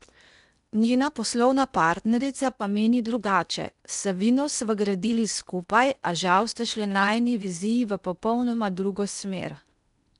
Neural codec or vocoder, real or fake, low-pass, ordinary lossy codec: codec, 16 kHz in and 24 kHz out, 0.8 kbps, FocalCodec, streaming, 65536 codes; fake; 10.8 kHz; none